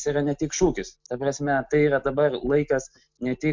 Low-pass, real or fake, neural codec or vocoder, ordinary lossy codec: 7.2 kHz; real; none; MP3, 64 kbps